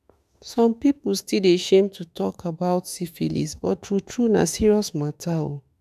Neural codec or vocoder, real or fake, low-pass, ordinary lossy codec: autoencoder, 48 kHz, 32 numbers a frame, DAC-VAE, trained on Japanese speech; fake; 14.4 kHz; none